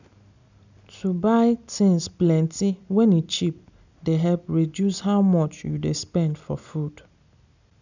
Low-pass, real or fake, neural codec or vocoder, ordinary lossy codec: 7.2 kHz; real; none; none